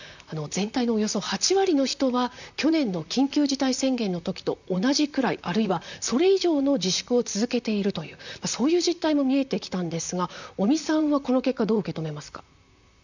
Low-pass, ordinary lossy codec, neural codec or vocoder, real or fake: 7.2 kHz; none; vocoder, 44.1 kHz, 128 mel bands, Pupu-Vocoder; fake